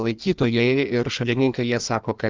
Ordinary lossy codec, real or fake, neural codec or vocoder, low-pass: Opus, 32 kbps; fake; codec, 16 kHz in and 24 kHz out, 1.1 kbps, FireRedTTS-2 codec; 7.2 kHz